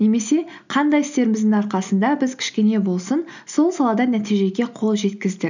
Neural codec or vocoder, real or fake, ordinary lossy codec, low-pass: none; real; none; 7.2 kHz